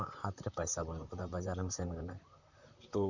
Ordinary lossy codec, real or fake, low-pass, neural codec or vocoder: none; fake; 7.2 kHz; vocoder, 44.1 kHz, 128 mel bands, Pupu-Vocoder